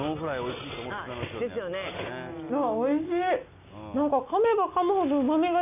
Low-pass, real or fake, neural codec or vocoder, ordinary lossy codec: 3.6 kHz; real; none; none